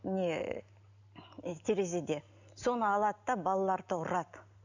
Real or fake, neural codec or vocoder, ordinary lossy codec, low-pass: real; none; none; 7.2 kHz